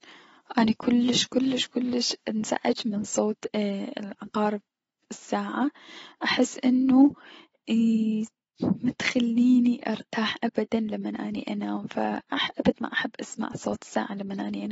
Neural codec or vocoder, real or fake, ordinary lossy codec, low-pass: none; real; AAC, 24 kbps; 10.8 kHz